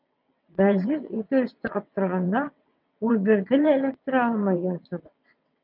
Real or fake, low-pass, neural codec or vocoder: fake; 5.4 kHz; vocoder, 22.05 kHz, 80 mel bands, WaveNeXt